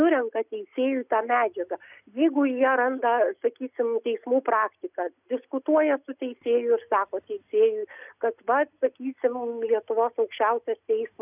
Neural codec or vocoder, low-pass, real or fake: none; 3.6 kHz; real